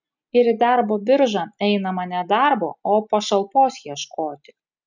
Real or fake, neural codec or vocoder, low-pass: real; none; 7.2 kHz